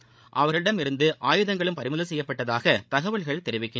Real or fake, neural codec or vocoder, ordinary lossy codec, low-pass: fake; codec, 16 kHz, 16 kbps, FreqCodec, larger model; none; none